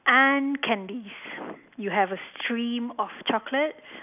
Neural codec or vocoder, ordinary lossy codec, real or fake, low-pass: none; none; real; 3.6 kHz